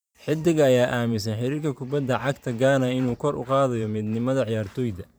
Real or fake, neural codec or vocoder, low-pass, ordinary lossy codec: fake; vocoder, 44.1 kHz, 128 mel bands every 512 samples, BigVGAN v2; none; none